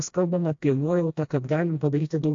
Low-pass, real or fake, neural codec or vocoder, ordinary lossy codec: 7.2 kHz; fake; codec, 16 kHz, 1 kbps, FreqCodec, smaller model; AAC, 48 kbps